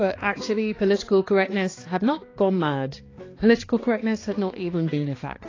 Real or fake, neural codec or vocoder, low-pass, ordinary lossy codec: fake; codec, 16 kHz, 2 kbps, X-Codec, HuBERT features, trained on balanced general audio; 7.2 kHz; AAC, 32 kbps